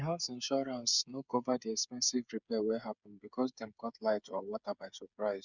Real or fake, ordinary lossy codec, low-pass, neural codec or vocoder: fake; none; 7.2 kHz; codec, 16 kHz, 8 kbps, FreqCodec, smaller model